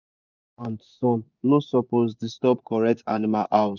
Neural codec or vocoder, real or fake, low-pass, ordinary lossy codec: vocoder, 24 kHz, 100 mel bands, Vocos; fake; 7.2 kHz; none